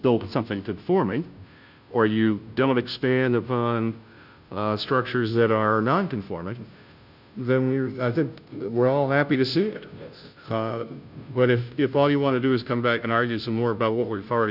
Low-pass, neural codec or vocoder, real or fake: 5.4 kHz; codec, 16 kHz, 0.5 kbps, FunCodec, trained on Chinese and English, 25 frames a second; fake